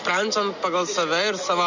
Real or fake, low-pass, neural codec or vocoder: real; 7.2 kHz; none